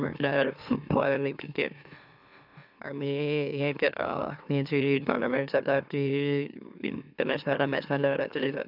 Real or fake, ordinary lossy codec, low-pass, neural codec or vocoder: fake; none; 5.4 kHz; autoencoder, 44.1 kHz, a latent of 192 numbers a frame, MeloTTS